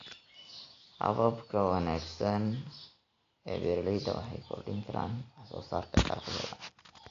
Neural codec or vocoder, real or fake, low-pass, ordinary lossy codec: none; real; 7.2 kHz; AAC, 48 kbps